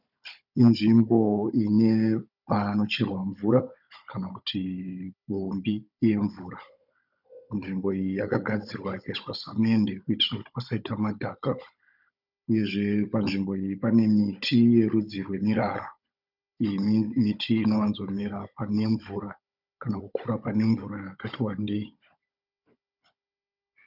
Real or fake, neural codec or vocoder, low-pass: fake; codec, 16 kHz, 16 kbps, FunCodec, trained on Chinese and English, 50 frames a second; 5.4 kHz